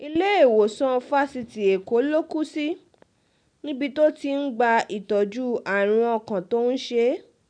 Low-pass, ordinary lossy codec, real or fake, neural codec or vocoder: 9.9 kHz; none; real; none